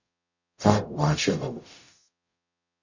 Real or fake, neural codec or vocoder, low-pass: fake; codec, 44.1 kHz, 0.9 kbps, DAC; 7.2 kHz